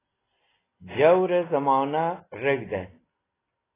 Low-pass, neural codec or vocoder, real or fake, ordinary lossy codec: 3.6 kHz; none; real; AAC, 16 kbps